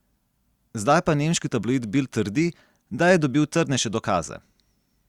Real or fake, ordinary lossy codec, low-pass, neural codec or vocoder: real; Opus, 64 kbps; 19.8 kHz; none